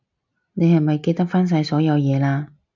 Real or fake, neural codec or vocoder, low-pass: real; none; 7.2 kHz